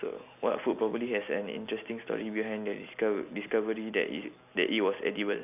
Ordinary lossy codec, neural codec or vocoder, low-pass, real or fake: none; none; 3.6 kHz; real